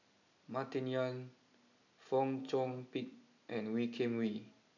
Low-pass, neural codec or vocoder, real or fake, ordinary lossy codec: 7.2 kHz; none; real; none